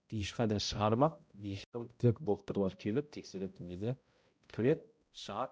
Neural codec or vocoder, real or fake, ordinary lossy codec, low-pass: codec, 16 kHz, 0.5 kbps, X-Codec, HuBERT features, trained on balanced general audio; fake; none; none